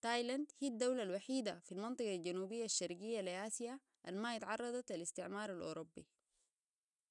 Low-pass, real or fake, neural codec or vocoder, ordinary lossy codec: 10.8 kHz; real; none; none